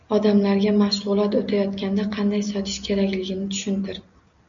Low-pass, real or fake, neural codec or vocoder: 7.2 kHz; real; none